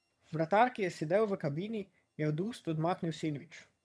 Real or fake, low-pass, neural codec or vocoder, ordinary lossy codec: fake; none; vocoder, 22.05 kHz, 80 mel bands, HiFi-GAN; none